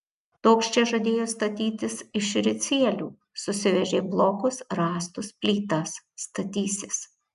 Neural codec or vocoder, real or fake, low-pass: none; real; 10.8 kHz